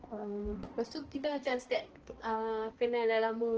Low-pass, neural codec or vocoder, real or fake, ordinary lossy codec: 7.2 kHz; codec, 16 kHz, 1 kbps, X-Codec, HuBERT features, trained on balanced general audio; fake; Opus, 16 kbps